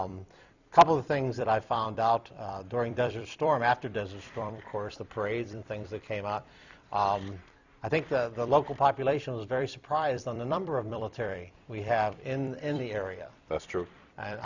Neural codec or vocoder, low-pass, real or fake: vocoder, 44.1 kHz, 128 mel bands every 256 samples, BigVGAN v2; 7.2 kHz; fake